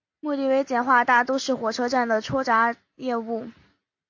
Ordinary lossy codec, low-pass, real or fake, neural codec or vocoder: AAC, 48 kbps; 7.2 kHz; real; none